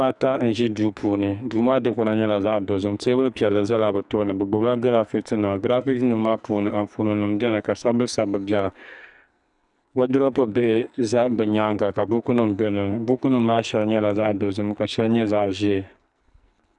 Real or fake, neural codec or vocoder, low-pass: fake; codec, 44.1 kHz, 2.6 kbps, SNAC; 10.8 kHz